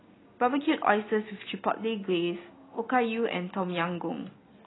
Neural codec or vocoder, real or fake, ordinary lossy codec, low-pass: none; real; AAC, 16 kbps; 7.2 kHz